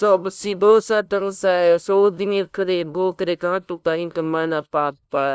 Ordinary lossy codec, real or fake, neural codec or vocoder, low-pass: none; fake; codec, 16 kHz, 0.5 kbps, FunCodec, trained on LibriTTS, 25 frames a second; none